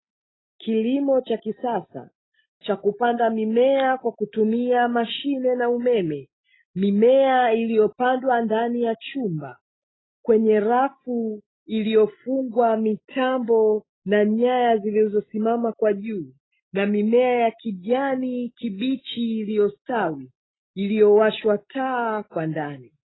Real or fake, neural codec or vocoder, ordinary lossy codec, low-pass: real; none; AAC, 16 kbps; 7.2 kHz